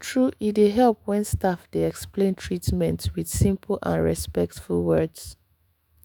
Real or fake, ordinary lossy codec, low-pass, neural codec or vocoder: fake; none; none; autoencoder, 48 kHz, 128 numbers a frame, DAC-VAE, trained on Japanese speech